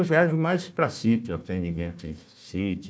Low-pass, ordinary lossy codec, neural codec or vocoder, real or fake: none; none; codec, 16 kHz, 1 kbps, FunCodec, trained on Chinese and English, 50 frames a second; fake